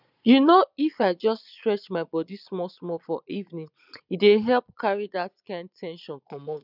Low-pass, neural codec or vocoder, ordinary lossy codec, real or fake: 5.4 kHz; vocoder, 22.05 kHz, 80 mel bands, Vocos; none; fake